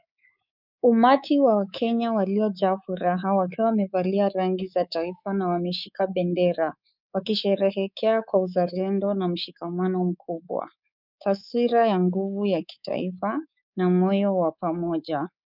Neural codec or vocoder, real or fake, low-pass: codec, 24 kHz, 3.1 kbps, DualCodec; fake; 5.4 kHz